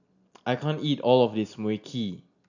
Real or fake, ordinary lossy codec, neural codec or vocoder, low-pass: real; none; none; 7.2 kHz